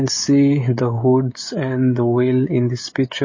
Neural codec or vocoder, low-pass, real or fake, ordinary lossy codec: codec, 16 kHz, 16 kbps, FreqCodec, smaller model; 7.2 kHz; fake; MP3, 32 kbps